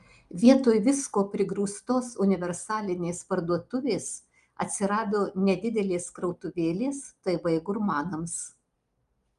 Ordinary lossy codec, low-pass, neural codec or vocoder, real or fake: Opus, 32 kbps; 10.8 kHz; none; real